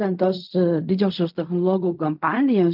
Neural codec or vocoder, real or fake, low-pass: codec, 16 kHz in and 24 kHz out, 0.4 kbps, LongCat-Audio-Codec, fine tuned four codebook decoder; fake; 5.4 kHz